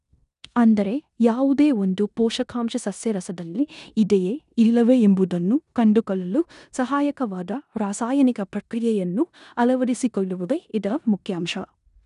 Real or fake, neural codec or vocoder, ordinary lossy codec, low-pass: fake; codec, 16 kHz in and 24 kHz out, 0.9 kbps, LongCat-Audio-Codec, four codebook decoder; none; 10.8 kHz